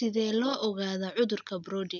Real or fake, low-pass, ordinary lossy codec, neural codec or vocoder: real; 7.2 kHz; none; none